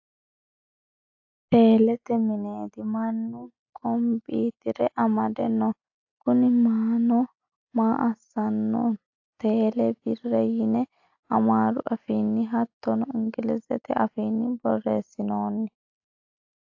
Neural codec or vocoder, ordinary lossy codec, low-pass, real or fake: none; AAC, 48 kbps; 7.2 kHz; real